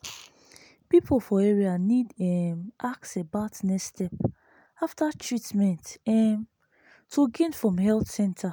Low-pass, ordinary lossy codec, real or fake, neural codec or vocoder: none; none; real; none